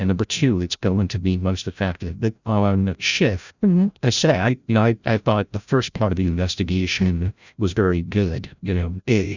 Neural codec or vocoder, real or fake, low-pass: codec, 16 kHz, 0.5 kbps, FreqCodec, larger model; fake; 7.2 kHz